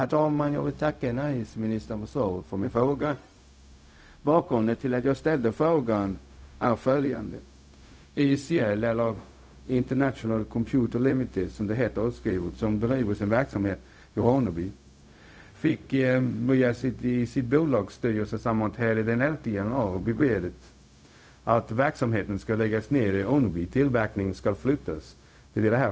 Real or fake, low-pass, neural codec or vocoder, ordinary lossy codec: fake; none; codec, 16 kHz, 0.4 kbps, LongCat-Audio-Codec; none